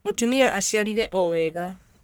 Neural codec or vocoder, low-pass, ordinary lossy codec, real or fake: codec, 44.1 kHz, 1.7 kbps, Pupu-Codec; none; none; fake